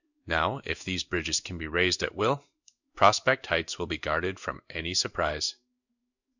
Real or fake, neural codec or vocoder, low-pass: fake; codec, 16 kHz in and 24 kHz out, 1 kbps, XY-Tokenizer; 7.2 kHz